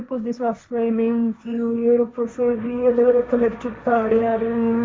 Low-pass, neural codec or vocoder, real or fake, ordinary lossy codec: none; codec, 16 kHz, 1.1 kbps, Voila-Tokenizer; fake; none